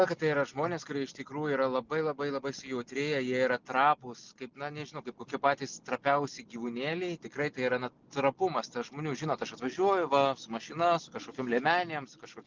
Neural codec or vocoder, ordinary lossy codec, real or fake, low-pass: none; Opus, 24 kbps; real; 7.2 kHz